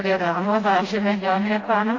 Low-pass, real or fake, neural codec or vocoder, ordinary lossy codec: 7.2 kHz; fake; codec, 16 kHz, 0.5 kbps, FreqCodec, smaller model; AAC, 32 kbps